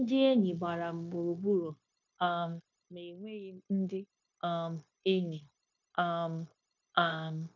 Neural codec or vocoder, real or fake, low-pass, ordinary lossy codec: codec, 16 kHz, 0.9 kbps, LongCat-Audio-Codec; fake; 7.2 kHz; none